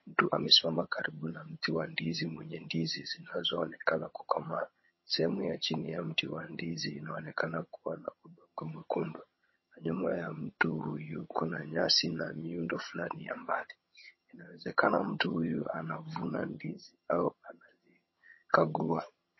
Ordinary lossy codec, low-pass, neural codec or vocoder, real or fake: MP3, 24 kbps; 7.2 kHz; vocoder, 22.05 kHz, 80 mel bands, HiFi-GAN; fake